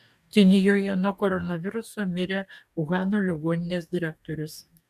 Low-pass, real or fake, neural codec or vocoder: 14.4 kHz; fake; codec, 44.1 kHz, 2.6 kbps, DAC